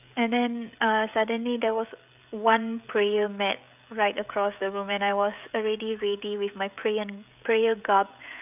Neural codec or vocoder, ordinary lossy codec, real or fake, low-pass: codec, 16 kHz, 16 kbps, FreqCodec, smaller model; none; fake; 3.6 kHz